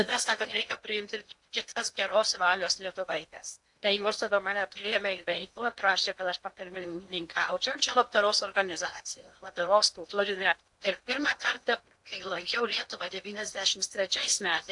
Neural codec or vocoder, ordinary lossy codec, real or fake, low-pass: codec, 16 kHz in and 24 kHz out, 0.8 kbps, FocalCodec, streaming, 65536 codes; AAC, 64 kbps; fake; 10.8 kHz